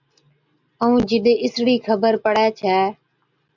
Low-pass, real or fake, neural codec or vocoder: 7.2 kHz; real; none